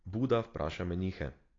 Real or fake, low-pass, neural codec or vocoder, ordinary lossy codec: real; 7.2 kHz; none; AAC, 32 kbps